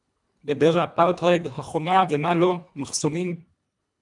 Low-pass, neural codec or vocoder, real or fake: 10.8 kHz; codec, 24 kHz, 1.5 kbps, HILCodec; fake